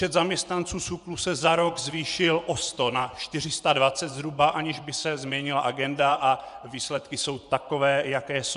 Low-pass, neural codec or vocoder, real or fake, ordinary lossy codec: 10.8 kHz; vocoder, 24 kHz, 100 mel bands, Vocos; fake; MP3, 96 kbps